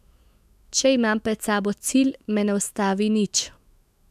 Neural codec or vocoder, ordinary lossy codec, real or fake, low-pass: autoencoder, 48 kHz, 128 numbers a frame, DAC-VAE, trained on Japanese speech; MP3, 96 kbps; fake; 14.4 kHz